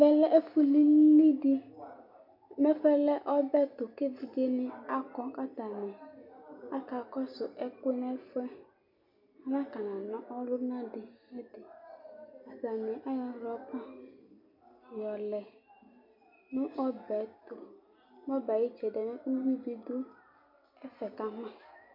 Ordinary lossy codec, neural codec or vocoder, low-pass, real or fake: MP3, 48 kbps; none; 5.4 kHz; real